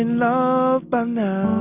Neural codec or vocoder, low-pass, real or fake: none; 3.6 kHz; real